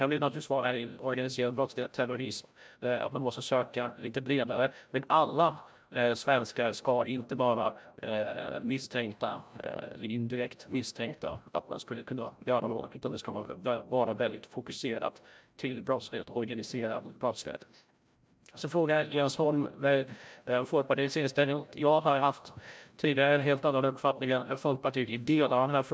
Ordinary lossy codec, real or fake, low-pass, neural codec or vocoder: none; fake; none; codec, 16 kHz, 0.5 kbps, FreqCodec, larger model